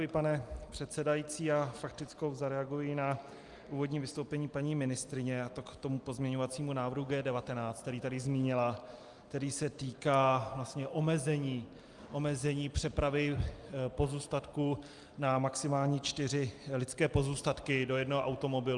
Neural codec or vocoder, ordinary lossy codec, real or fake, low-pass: none; Opus, 32 kbps; real; 10.8 kHz